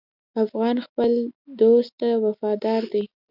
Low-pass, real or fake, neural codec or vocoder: 5.4 kHz; real; none